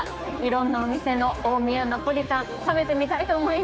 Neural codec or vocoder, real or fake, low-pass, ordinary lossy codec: codec, 16 kHz, 4 kbps, X-Codec, HuBERT features, trained on general audio; fake; none; none